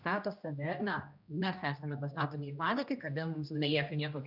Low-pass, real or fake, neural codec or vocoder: 5.4 kHz; fake; codec, 16 kHz, 1 kbps, X-Codec, HuBERT features, trained on general audio